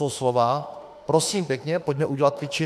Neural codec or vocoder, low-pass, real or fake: autoencoder, 48 kHz, 32 numbers a frame, DAC-VAE, trained on Japanese speech; 14.4 kHz; fake